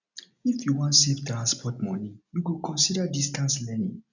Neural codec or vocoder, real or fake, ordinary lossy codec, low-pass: none; real; none; 7.2 kHz